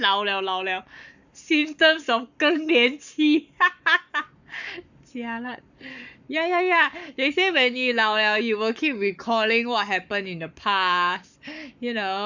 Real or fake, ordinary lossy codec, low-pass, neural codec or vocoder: fake; none; 7.2 kHz; codec, 44.1 kHz, 7.8 kbps, Pupu-Codec